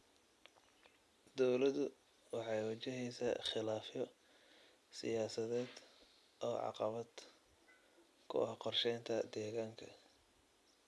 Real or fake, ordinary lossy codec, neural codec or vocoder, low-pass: real; none; none; none